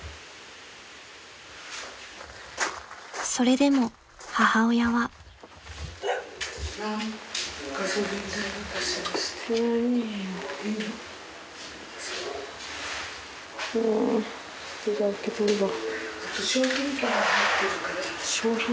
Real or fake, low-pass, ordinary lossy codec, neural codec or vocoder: real; none; none; none